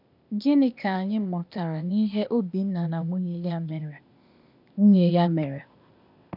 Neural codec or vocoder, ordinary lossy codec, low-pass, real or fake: codec, 16 kHz, 0.8 kbps, ZipCodec; none; 5.4 kHz; fake